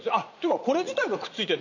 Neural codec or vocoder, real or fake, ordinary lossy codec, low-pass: vocoder, 44.1 kHz, 80 mel bands, Vocos; fake; none; 7.2 kHz